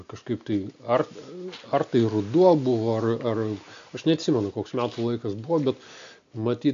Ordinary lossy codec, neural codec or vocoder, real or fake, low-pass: AAC, 64 kbps; none; real; 7.2 kHz